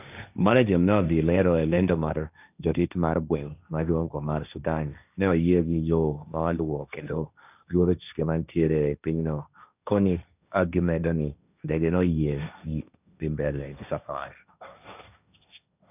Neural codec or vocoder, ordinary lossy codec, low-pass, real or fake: codec, 16 kHz, 1.1 kbps, Voila-Tokenizer; none; 3.6 kHz; fake